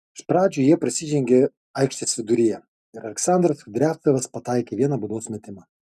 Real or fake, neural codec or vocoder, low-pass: real; none; 14.4 kHz